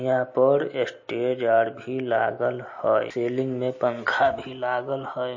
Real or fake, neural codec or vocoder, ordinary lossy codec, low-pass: real; none; MP3, 32 kbps; 7.2 kHz